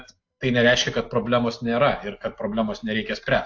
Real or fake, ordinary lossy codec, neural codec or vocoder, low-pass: real; AAC, 48 kbps; none; 7.2 kHz